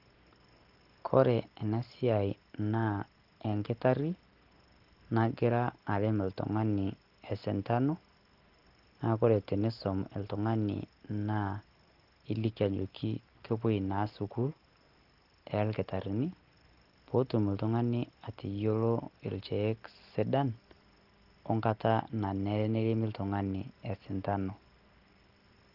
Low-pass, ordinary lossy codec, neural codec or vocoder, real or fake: 5.4 kHz; Opus, 16 kbps; none; real